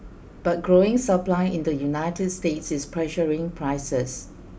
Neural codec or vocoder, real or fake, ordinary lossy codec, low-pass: none; real; none; none